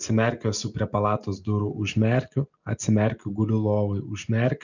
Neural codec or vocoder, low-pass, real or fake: none; 7.2 kHz; real